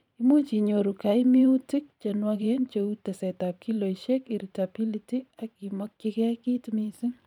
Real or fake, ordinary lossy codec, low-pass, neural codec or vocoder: fake; none; 19.8 kHz; vocoder, 44.1 kHz, 128 mel bands every 512 samples, BigVGAN v2